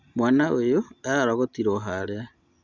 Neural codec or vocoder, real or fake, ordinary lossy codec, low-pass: none; real; Opus, 64 kbps; 7.2 kHz